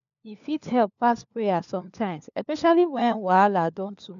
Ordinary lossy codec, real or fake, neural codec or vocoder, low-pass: none; fake; codec, 16 kHz, 4 kbps, FunCodec, trained on LibriTTS, 50 frames a second; 7.2 kHz